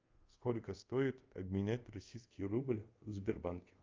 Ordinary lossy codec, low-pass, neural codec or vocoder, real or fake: Opus, 24 kbps; 7.2 kHz; codec, 24 kHz, 0.5 kbps, DualCodec; fake